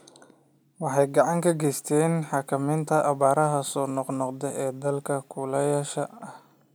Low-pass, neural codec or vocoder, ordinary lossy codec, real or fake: none; none; none; real